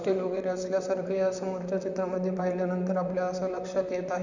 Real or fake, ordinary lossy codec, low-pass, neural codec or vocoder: fake; none; 7.2 kHz; vocoder, 44.1 kHz, 128 mel bands, Pupu-Vocoder